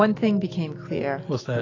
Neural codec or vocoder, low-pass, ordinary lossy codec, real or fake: none; 7.2 kHz; AAC, 32 kbps; real